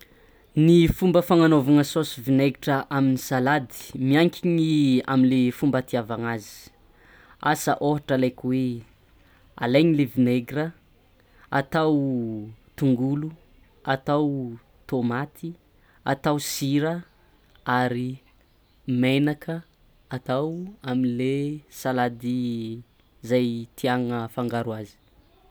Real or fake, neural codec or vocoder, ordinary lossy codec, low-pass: real; none; none; none